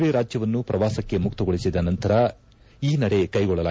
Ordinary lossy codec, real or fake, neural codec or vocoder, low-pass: none; real; none; none